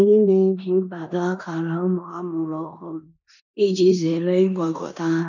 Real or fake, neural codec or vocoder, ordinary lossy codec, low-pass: fake; codec, 16 kHz in and 24 kHz out, 0.9 kbps, LongCat-Audio-Codec, four codebook decoder; none; 7.2 kHz